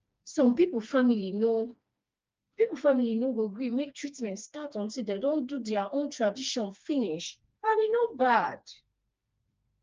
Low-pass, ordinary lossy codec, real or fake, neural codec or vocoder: 7.2 kHz; Opus, 24 kbps; fake; codec, 16 kHz, 2 kbps, FreqCodec, smaller model